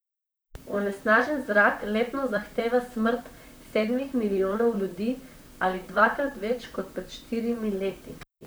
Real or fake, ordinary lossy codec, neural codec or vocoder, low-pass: fake; none; vocoder, 44.1 kHz, 128 mel bands, Pupu-Vocoder; none